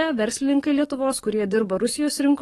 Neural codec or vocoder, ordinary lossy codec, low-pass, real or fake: codec, 44.1 kHz, 7.8 kbps, DAC; AAC, 32 kbps; 19.8 kHz; fake